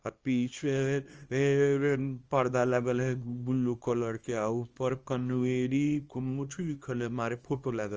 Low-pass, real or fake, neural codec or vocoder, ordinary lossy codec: 7.2 kHz; fake; codec, 24 kHz, 0.9 kbps, WavTokenizer, small release; Opus, 24 kbps